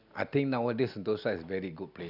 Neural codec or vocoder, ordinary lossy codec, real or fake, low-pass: none; none; real; 5.4 kHz